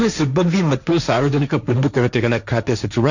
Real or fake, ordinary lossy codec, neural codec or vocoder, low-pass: fake; none; codec, 16 kHz, 1.1 kbps, Voila-Tokenizer; 7.2 kHz